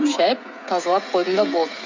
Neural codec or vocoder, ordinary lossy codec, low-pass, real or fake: codec, 16 kHz, 16 kbps, FreqCodec, smaller model; MP3, 48 kbps; 7.2 kHz; fake